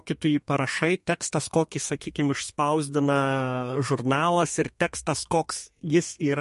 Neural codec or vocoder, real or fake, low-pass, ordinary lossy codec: codec, 32 kHz, 1.9 kbps, SNAC; fake; 14.4 kHz; MP3, 48 kbps